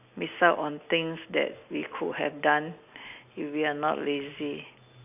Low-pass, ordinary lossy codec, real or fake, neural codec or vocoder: 3.6 kHz; none; real; none